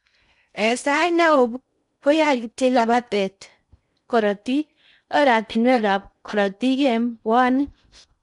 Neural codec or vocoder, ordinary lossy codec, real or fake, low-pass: codec, 16 kHz in and 24 kHz out, 0.6 kbps, FocalCodec, streaming, 2048 codes; none; fake; 10.8 kHz